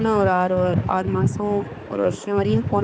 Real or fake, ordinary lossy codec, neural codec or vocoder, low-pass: fake; none; codec, 16 kHz, 4 kbps, X-Codec, HuBERT features, trained on balanced general audio; none